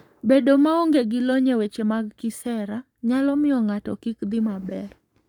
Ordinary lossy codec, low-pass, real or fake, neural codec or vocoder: none; 19.8 kHz; fake; codec, 44.1 kHz, 7.8 kbps, Pupu-Codec